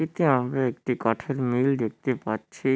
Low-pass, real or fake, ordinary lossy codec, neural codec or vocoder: none; real; none; none